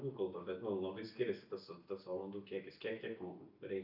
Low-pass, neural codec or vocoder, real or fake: 5.4 kHz; none; real